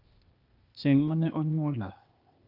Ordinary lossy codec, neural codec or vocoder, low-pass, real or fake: Opus, 32 kbps; codec, 16 kHz, 0.8 kbps, ZipCodec; 5.4 kHz; fake